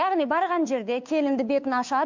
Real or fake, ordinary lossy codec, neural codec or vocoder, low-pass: fake; MP3, 64 kbps; codec, 16 kHz, 2 kbps, FunCodec, trained on Chinese and English, 25 frames a second; 7.2 kHz